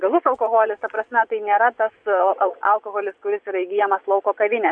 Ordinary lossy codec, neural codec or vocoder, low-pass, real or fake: AAC, 64 kbps; vocoder, 24 kHz, 100 mel bands, Vocos; 9.9 kHz; fake